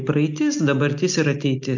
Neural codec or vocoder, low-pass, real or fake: none; 7.2 kHz; real